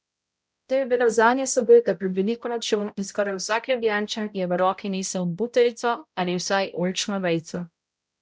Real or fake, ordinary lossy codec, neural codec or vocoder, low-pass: fake; none; codec, 16 kHz, 0.5 kbps, X-Codec, HuBERT features, trained on balanced general audio; none